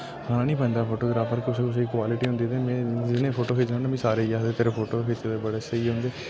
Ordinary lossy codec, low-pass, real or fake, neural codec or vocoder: none; none; real; none